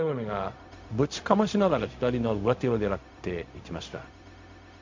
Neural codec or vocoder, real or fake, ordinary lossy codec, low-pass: codec, 16 kHz, 0.4 kbps, LongCat-Audio-Codec; fake; MP3, 48 kbps; 7.2 kHz